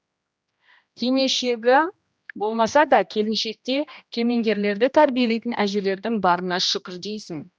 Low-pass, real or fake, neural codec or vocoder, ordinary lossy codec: none; fake; codec, 16 kHz, 1 kbps, X-Codec, HuBERT features, trained on general audio; none